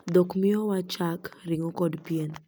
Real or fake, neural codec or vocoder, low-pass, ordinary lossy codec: real; none; none; none